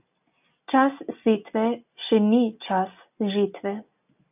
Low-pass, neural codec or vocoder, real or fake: 3.6 kHz; none; real